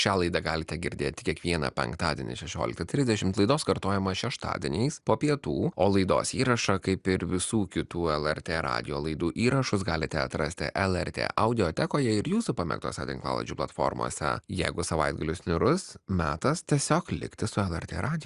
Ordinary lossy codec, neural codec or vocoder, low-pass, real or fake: Opus, 64 kbps; none; 10.8 kHz; real